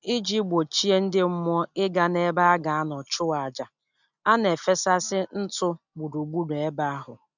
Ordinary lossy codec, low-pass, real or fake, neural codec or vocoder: none; 7.2 kHz; real; none